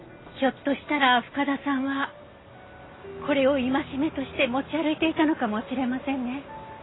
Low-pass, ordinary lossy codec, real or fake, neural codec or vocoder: 7.2 kHz; AAC, 16 kbps; real; none